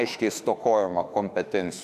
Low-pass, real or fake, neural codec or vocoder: 14.4 kHz; fake; autoencoder, 48 kHz, 32 numbers a frame, DAC-VAE, trained on Japanese speech